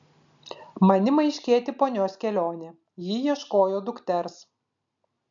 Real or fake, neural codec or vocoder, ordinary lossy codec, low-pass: real; none; AAC, 64 kbps; 7.2 kHz